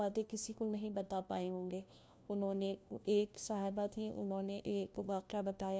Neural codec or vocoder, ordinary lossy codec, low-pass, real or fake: codec, 16 kHz, 1 kbps, FunCodec, trained on LibriTTS, 50 frames a second; none; none; fake